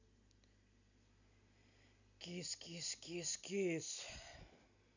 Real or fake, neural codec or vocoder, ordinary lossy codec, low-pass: real; none; none; 7.2 kHz